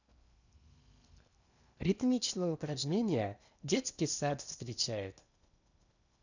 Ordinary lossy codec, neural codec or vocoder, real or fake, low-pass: none; codec, 16 kHz in and 24 kHz out, 0.8 kbps, FocalCodec, streaming, 65536 codes; fake; 7.2 kHz